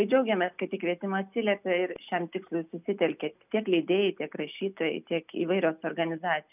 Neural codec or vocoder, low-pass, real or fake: none; 3.6 kHz; real